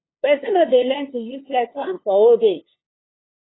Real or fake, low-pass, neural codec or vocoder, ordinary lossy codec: fake; 7.2 kHz; codec, 16 kHz, 2 kbps, FunCodec, trained on LibriTTS, 25 frames a second; AAC, 16 kbps